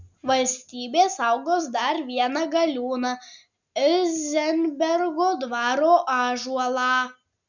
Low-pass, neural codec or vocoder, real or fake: 7.2 kHz; none; real